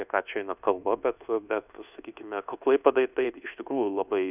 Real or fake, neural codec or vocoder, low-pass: fake; codec, 24 kHz, 1.2 kbps, DualCodec; 3.6 kHz